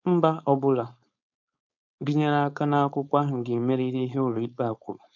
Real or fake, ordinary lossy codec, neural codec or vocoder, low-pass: fake; none; codec, 16 kHz, 4.8 kbps, FACodec; 7.2 kHz